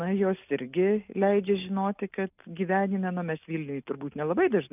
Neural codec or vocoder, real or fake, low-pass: none; real; 3.6 kHz